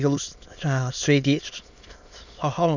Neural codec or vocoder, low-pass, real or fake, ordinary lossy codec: autoencoder, 22.05 kHz, a latent of 192 numbers a frame, VITS, trained on many speakers; 7.2 kHz; fake; none